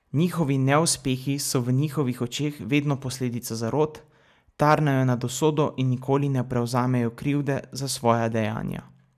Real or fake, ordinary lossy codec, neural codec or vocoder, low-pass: real; none; none; 14.4 kHz